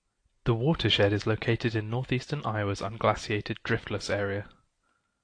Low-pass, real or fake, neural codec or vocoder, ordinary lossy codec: 9.9 kHz; real; none; AAC, 48 kbps